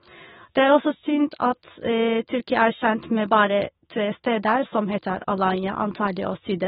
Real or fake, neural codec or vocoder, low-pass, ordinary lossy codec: fake; autoencoder, 48 kHz, 128 numbers a frame, DAC-VAE, trained on Japanese speech; 19.8 kHz; AAC, 16 kbps